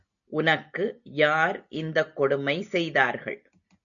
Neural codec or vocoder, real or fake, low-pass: none; real; 7.2 kHz